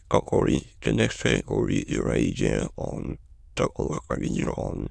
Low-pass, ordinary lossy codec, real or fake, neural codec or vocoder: none; none; fake; autoencoder, 22.05 kHz, a latent of 192 numbers a frame, VITS, trained on many speakers